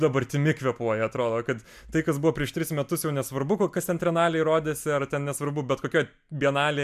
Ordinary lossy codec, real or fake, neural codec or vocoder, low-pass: MP3, 96 kbps; real; none; 14.4 kHz